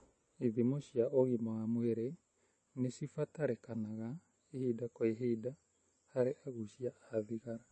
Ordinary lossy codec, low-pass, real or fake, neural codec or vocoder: MP3, 32 kbps; 10.8 kHz; real; none